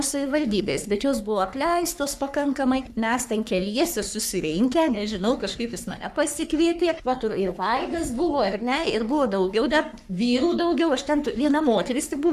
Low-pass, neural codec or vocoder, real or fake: 14.4 kHz; codec, 44.1 kHz, 3.4 kbps, Pupu-Codec; fake